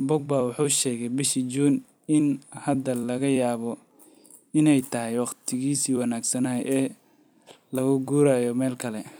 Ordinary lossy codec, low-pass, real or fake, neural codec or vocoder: none; none; fake; vocoder, 44.1 kHz, 128 mel bands every 256 samples, BigVGAN v2